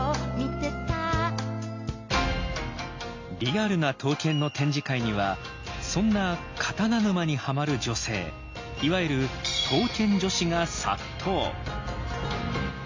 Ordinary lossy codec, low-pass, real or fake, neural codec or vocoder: MP3, 48 kbps; 7.2 kHz; real; none